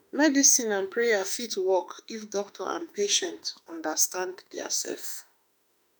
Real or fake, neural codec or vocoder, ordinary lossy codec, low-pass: fake; autoencoder, 48 kHz, 32 numbers a frame, DAC-VAE, trained on Japanese speech; none; none